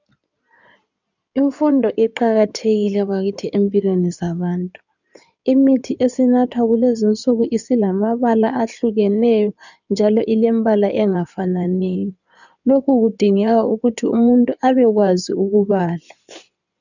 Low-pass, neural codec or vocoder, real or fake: 7.2 kHz; codec, 16 kHz in and 24 kHz out, 2.2 kbps, FireRedTTS-2 codec; fake